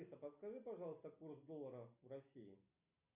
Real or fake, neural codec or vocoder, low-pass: real; none; 3.6 kHz